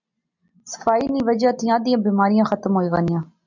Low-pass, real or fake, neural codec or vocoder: 7.2 kHz; real; none